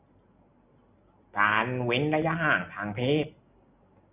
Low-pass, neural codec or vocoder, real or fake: 3.6 kHz; none; real